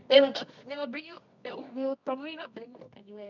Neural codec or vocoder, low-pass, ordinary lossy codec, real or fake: codec, 24 kHz, 0.9 kbps, WavTokenizer, medium music audio release; 7.2 kHz; none; fake